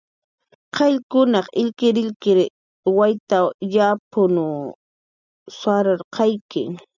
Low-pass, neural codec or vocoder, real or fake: 7.2 kHz; none; real